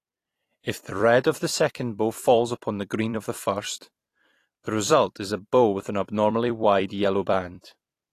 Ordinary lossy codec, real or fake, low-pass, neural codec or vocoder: AAC, 48 kbps; fake; 14.4 kHz; vocoder, 44.1 kHz, 128 mel bands every 256 samples, BigVGAN v2